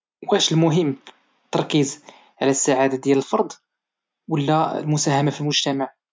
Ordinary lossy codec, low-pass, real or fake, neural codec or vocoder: none; none; real; none